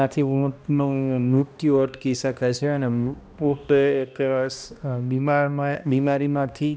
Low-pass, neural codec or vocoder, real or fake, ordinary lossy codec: none; codec, 16 kHz, 1 kbps, X-Codec, HuBERT features, trained on balanced general audio; fake; none